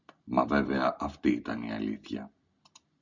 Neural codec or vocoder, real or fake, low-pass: none; real; 7.2 kHz